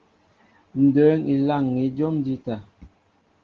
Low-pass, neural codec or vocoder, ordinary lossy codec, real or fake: 7.2 kHz; none; Opus, 16 kbps; real